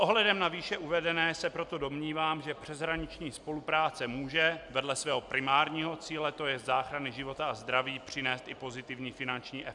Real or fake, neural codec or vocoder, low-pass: real; none; 10.8 kHz